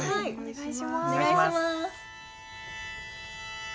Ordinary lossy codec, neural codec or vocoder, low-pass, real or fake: none; none; none; real